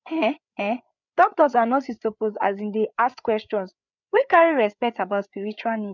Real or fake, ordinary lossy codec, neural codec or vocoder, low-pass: fake; none; codec, 16 kHz, 8 kbps, FreqCodec, larger model; 7.2 kHz